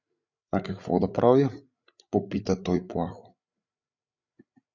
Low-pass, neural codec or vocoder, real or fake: 7.2 kHz; codec, 16 kHz, 8 kbps, FreqCodec, larger model; fake